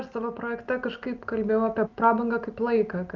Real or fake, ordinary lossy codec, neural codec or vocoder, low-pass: real; Opus, 24 kbps; none; 7.2 kHz